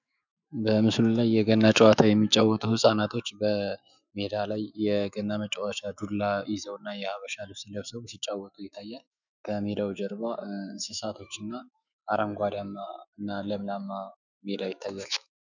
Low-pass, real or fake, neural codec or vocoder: 7.2 kHz; fake; autoencoder, 48 kHz, 128 numbers a frame, DAC-VAE, trained on Japanese speech